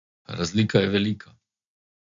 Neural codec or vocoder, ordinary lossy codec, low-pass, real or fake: none; MP3, 64 kbps; 7.2 kHz; real